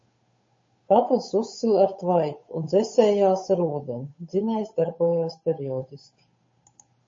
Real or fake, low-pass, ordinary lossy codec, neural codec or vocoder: fake; 7.2 kHz; MP3, 32 kbps; codec, 16 kHz, 8 kbps, FunCodec, trained on Chinese and English, 25 frames a second